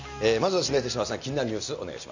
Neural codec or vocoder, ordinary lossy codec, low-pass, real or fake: none; none; 7.2 kHz; real